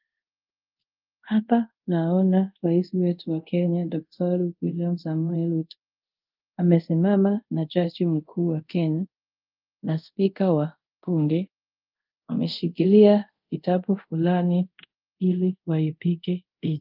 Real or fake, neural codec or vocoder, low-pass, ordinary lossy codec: fake; codec, 24 kHz, 0.5 kbps, DualCodec; 5.4 kHz; Opus, 24 kbps